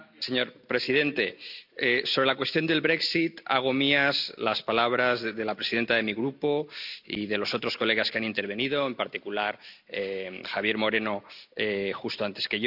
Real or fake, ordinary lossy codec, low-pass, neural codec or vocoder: real; none; 5.4 kHz; none